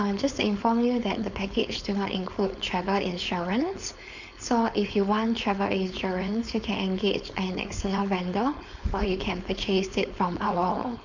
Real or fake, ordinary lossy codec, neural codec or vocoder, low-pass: fake; none; codec, 16 kHz, 4.8 kbps, FACodec; 7.2 kHz